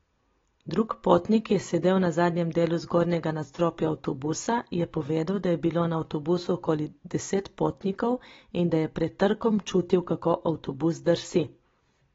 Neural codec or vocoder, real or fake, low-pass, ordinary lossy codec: none; real; 7.2 kHz; AAC, 24 kbps